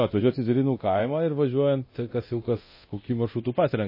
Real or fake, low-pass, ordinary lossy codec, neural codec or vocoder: fake; 5.4 kHz; MP3, 24 kbps; codec, 24 kHz, 0.9 kbps, DualCodec